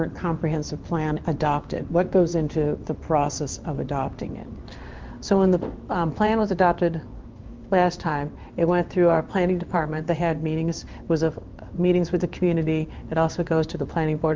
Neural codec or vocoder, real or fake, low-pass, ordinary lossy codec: codec, 16 kHz in and 24 kHz out, 1 kbps, XY-Tokenizer; fake; 7.2 kHz; Opus, 24 kbps